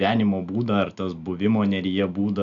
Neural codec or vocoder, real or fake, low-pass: none; real; 7.2 kHz